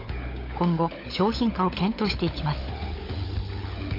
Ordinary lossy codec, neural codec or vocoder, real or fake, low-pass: AAC, 32 kbps; codec, 16 kHz, 16 kbps, FunCodec, trained on LibriTTS, 50 frames a second; fake; 5.4 kHz